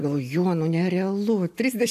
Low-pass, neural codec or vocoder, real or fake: 14.4 kHz; none; real